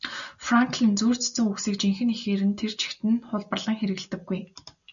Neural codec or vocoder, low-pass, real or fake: none; 7.2 kHz; real